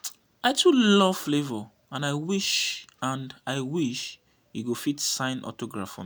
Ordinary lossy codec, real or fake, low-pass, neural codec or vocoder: none; real; none; none